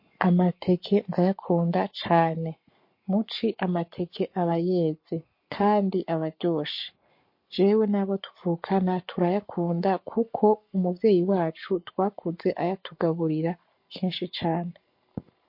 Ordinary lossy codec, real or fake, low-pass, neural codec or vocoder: MP3, 32 kbps; fake; 5.4 kHz; codec, 44.1 kHz, 7.8 kbps, Pupu-Codec